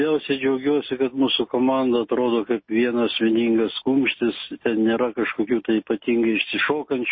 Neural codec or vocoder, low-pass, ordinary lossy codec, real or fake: none; 7.2 kHz; MP3, 24 kbps; real